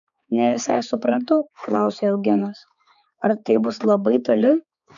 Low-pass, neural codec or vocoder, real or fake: 7.2 kHz; codec, 16 kHz, 4 kbps, X-Codec, HuBERT features, trained on balanced general audio; fake